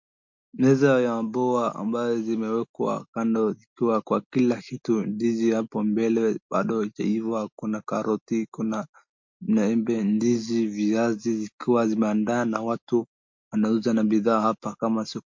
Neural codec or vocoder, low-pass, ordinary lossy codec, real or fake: none; 7.2 kHz; MP3, 48 kbps; real